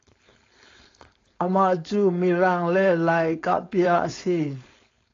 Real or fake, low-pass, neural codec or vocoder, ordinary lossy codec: fake; 7.2 kHz; codec, 16 kHz, 4.8 kbps, FACodec; AAC, 32 kbps